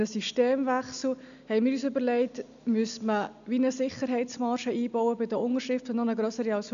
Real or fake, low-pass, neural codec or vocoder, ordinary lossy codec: real; 7.2 kHz; none; none